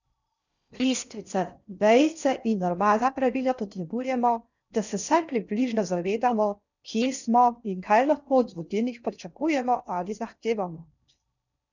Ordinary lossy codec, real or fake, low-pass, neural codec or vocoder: none; fake; 7.2 kHz; codec, 16 kHz in and 24 kHz out, 0.6 kbps, FocalCodec, streaming, 4096 codes